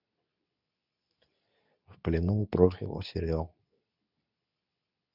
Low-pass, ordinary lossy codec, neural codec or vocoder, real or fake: 5.4 kHz; none; codec, 24 kHz, 0.9 kbps, WavTokenizer, medium speech release version 2; fake